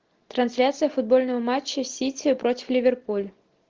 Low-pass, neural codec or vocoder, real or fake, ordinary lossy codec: 7.2 kHz; none; real; Opus, 16 kbps